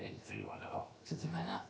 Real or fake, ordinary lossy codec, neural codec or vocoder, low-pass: fake; none; codec, 16 kHz, 0.7 kbps, FocalCodec; none